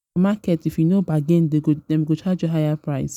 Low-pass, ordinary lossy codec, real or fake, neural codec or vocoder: 19.8 kHz; none; real; none